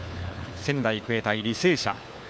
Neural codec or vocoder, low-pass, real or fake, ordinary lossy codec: codec, 16 kHz, 4 kbps, FunCodec, trained on LibriTTS, 50 frames a second; none; fake; none